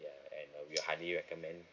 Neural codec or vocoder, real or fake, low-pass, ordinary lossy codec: none; real; 7.2 kHz; none